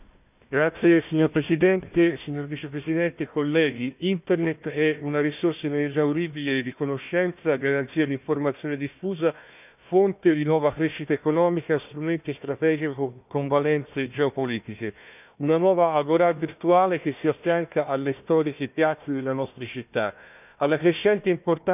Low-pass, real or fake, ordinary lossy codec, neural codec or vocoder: 3.6 kHz; fake; none; codec, 16 kHz, 1 kbps, FunCodec, trained on Chinese and English, 50 frames a second